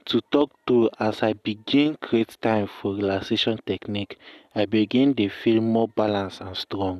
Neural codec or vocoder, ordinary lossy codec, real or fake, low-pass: none; none; real; 14.4 kHz